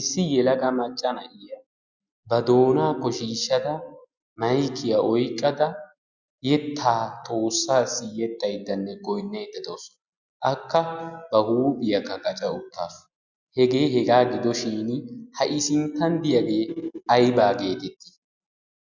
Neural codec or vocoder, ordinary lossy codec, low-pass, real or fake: none; Opus, 64 kbps; 7.2 kHz; real